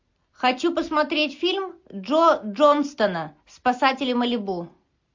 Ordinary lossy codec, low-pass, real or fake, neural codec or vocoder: MP3, 48 kbps; 7.2 kHz; real; none